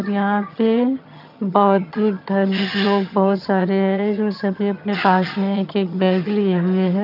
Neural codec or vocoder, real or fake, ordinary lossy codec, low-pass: vocoder, 22.05 kHz, 80 mel bands, HiFi-GAN; fake; none; 5.4 kHz